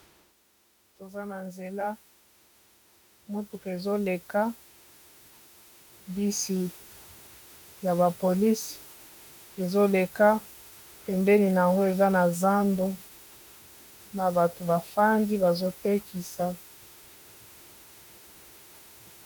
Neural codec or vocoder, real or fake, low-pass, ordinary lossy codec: autoencoder, 48 kHz, 32 numbers a frame, DAC-VAE, trained on Japanese speech; fake; 19.8 kHz; MP3, 96 kbps